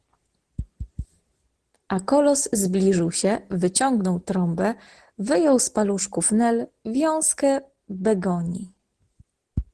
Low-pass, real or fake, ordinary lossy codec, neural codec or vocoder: 10.8 kHz; real; Opus, 16 kbps; none